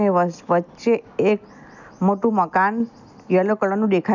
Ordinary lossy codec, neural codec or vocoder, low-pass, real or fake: none; none; 7.2 kHz; real